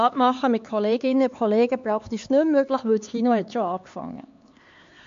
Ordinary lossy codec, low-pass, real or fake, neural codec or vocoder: MP3, 48 kbps; 7.2 kHz; fake; codec, 16 kHz, 4 kbps, X-Codec, HuBERT features, trained on LibriSpeech